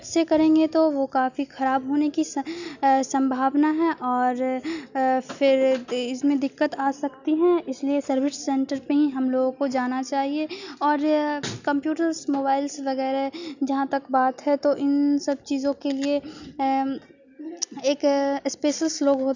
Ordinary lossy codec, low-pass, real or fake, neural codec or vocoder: none; 7.2 kHz; real; none